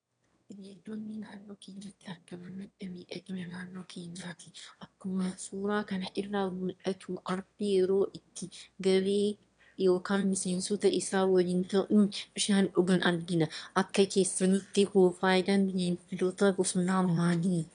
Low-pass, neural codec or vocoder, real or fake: 9.9 kHz; autoencoder, 22.05 kHz, a latent of 192 numbers a frame, VITS, trained on one speaker; fake